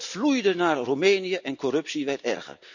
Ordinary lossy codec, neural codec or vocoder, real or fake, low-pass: none; none; real; 7.2 kHz